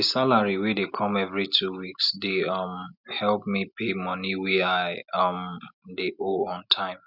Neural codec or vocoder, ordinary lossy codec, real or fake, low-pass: none; none; real; 5.4 kHz